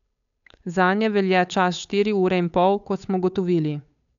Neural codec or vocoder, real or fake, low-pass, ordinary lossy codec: codec, 16 kHz, 8 kbps, FunCodec, trained on Chinese and English, 25 frames a second; fake; 7.2 kHz; none